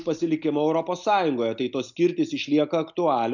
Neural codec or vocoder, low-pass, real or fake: none; 7.2 kHz; real